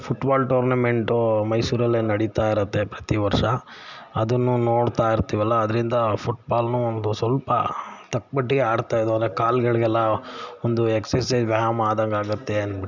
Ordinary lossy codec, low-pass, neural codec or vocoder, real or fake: none; 7.2 kHz; none; real